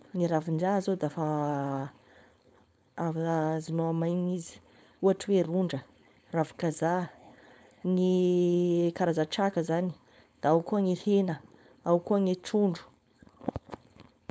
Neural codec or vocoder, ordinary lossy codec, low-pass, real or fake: codec, 16 kHz, 4.8 kbps, FACodec; none; none; fake